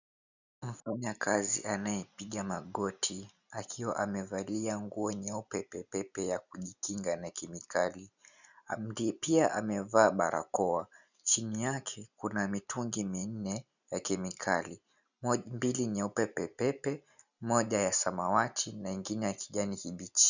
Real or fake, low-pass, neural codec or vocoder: real; 7.2 kHz; none